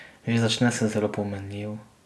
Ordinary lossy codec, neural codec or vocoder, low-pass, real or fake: none; none; none; real